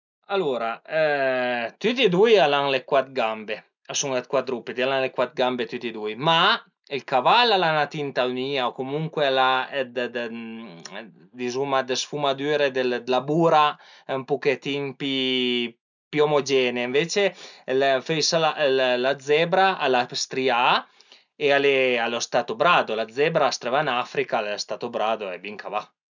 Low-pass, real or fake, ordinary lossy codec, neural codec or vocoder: 7.2 kHz; real; none; none